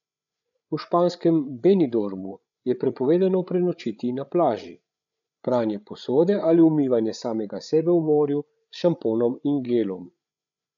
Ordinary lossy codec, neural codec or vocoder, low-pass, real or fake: none; codec, 16 kHz, 8 kbps, FreqCodec, larger model; 7.2 kHz; fake